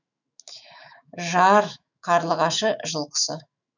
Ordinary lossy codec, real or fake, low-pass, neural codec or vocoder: none; fake; 7.2 kHz; autoencoder, 48 kHz, 128 numbers a frame, DAC-VAE, trained on Japanese speech